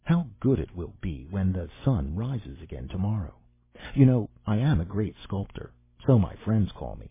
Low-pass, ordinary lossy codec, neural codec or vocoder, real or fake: 3.6 kHz; MP3, 16 kbps; none; real